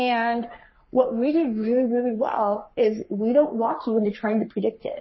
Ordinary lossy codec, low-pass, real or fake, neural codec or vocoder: MP3, 24 kbps; 7.2 kHz; fake; codec, 44.1 kHz, 3.4 kbps, Pupu-Codec